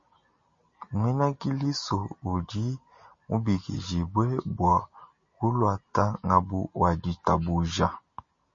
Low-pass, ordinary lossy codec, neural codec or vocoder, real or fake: 7.2 kHz; MP3, 32 kbps; none; real